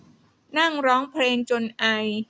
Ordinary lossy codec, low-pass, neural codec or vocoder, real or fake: none; none; none; real